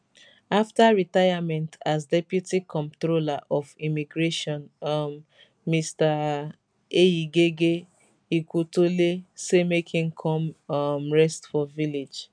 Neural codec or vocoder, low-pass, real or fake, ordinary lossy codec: none; 9.9 kHz; real; none